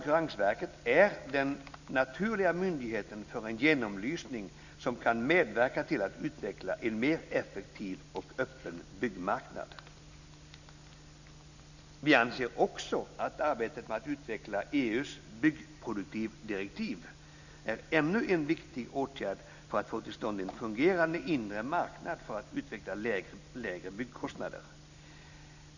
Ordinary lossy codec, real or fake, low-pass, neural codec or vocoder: none; real; 7.2 kHz; none